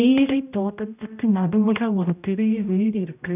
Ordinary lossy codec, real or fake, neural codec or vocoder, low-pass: none; fake; codec, 16 kHz, 0.5 kbps, X-Codec, HuBERT features, trained on general audio; 3.6 kHz